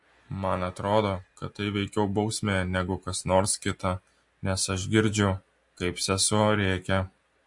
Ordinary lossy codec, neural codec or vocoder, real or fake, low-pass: MP3, 48 kbps; none; real; 10.8 kHz